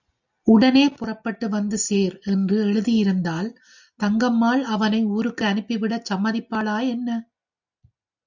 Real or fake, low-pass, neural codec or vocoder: real; 7.2 kHz; none